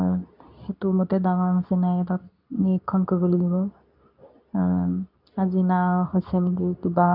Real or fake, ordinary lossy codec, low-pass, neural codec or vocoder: fake; none; 5.4 kHz; codec, 24 kHz, 0.9 kbps, WavTokenizer, medium speech release version 2